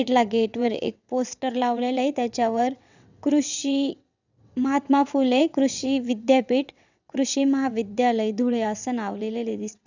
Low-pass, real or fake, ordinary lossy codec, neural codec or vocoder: 7.2 kHz; fake; none; vocoder, 22.05 kHz, 80 mel bands, WaveNeXt